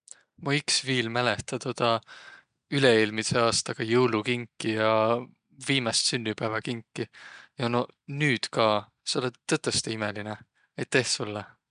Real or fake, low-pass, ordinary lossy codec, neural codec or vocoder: real; 9.9 kHz; MP3, 96 kbps; none